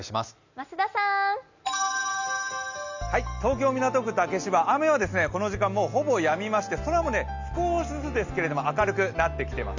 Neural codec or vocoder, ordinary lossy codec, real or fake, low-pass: none; none; real; 7.2 kHz